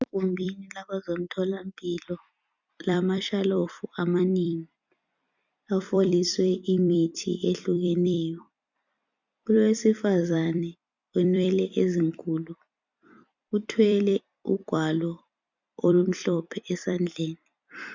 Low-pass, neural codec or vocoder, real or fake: 7.2 kHz; vocoder, 44.1 kHz, 128 mel bands every 256 samples, BigVGAN v2; fake